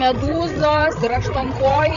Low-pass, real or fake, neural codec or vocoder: 7.2 kHz; fake; codec, 16 kHz, 16 kbps, FreqCodec, larger model